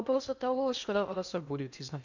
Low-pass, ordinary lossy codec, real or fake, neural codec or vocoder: 7.2 kHz; none; fake; codec, 16 kHz in and 24 kHz out, 0.6 kbps, FocalCodec, streaming, 2048 codes